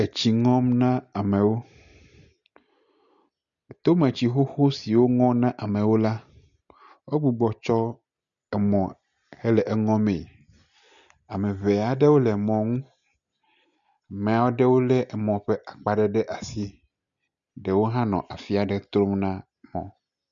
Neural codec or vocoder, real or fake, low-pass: none; real; 7.2 kHz